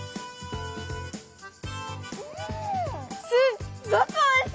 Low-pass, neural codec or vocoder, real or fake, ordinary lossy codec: none; none; real; none